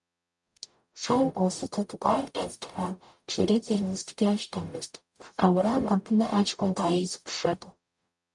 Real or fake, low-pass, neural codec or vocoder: fake; 10.8 kHz; codec, 44.1 kHz, 0.9 kbps, DAC